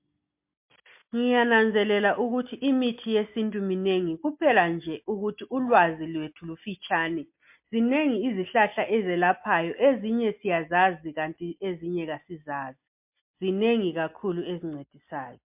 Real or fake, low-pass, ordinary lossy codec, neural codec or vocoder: real; 3.6 kHz; MP3, 32 kbps; none